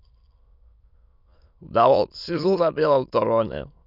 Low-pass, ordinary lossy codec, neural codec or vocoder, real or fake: 5.4 kHz; none; autoencoder, 22.05 kHz, a latent of 192 numbers a frame, VITS, trained on many speakers; fake